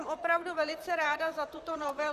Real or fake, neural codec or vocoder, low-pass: fake; vocoder, 44.1 kHz, 128 mel bands, Pupu-Vocoder; 14.4 kHz